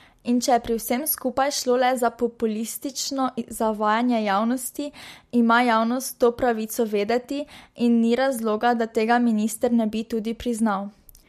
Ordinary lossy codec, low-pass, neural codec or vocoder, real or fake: MP3, 64 kbps; 14.4 kHz; none; real